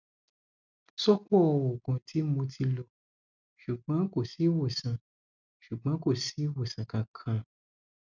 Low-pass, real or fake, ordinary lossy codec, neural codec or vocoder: 7.2 kHz; real; none; none